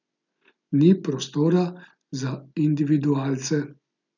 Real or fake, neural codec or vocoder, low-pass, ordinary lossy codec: real; none; 7.2 kHz; none